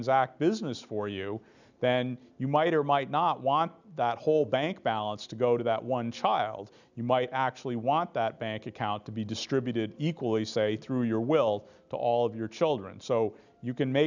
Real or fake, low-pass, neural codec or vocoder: real; 7.2 kHz; none